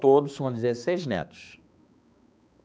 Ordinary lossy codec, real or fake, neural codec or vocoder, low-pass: none; fake; codec, 16 kHz, 2 kbps, X-Codec, HuBERT features, trained on balanced general audio; none